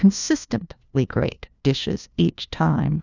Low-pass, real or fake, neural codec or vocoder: 7.2 kHz; fake; codec, 16 kHz, 1 kbps, FunCodec, trained on LibriTTS, 50 frames a second